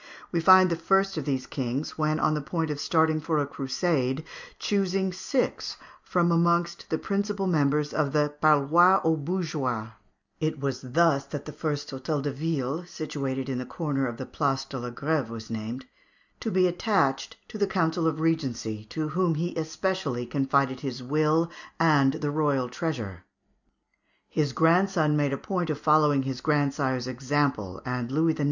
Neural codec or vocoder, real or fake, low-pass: none; real; 7.2 kHz